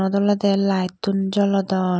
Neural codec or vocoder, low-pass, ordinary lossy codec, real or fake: none; none; none; real